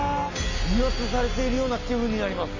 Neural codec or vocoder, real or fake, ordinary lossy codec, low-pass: none; real; AAC, 32 kbps; 7.2 kHz